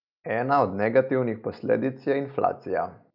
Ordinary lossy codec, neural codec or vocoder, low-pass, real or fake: none; none; 5.4 kHz; real